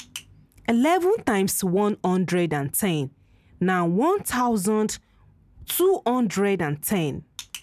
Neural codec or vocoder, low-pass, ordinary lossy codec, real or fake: none; 14.4 kHz; none; real